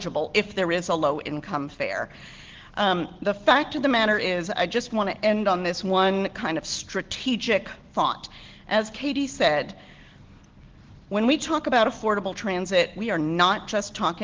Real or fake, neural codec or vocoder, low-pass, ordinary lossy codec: real; none; 7.2 kHz; Opus, 16 kbps